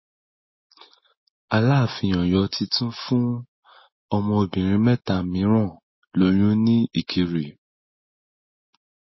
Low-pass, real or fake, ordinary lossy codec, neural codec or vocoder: 7.2 kHz; real; MP3, 24 kbps; none